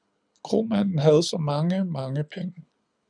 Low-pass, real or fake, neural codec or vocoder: 9.9 kHz; fake; codec, 24 kHz, 6 kbps, HILCodec